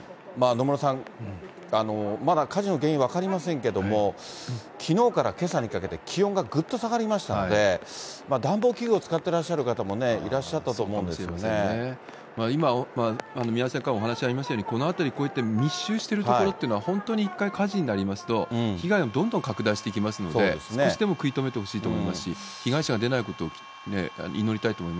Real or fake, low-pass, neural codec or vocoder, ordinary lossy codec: real; none; none; none